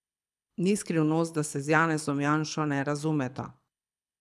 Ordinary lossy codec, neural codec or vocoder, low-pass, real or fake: none; codec, 24 kHz, 6 kbps, HILCodec; none; fake